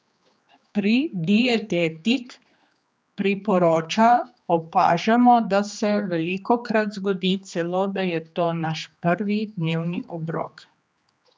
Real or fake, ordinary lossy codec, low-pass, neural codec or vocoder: fake; none; none; codec, 16 kHz, 2 kbps, X-Codec, HuBERT features, trained on general audio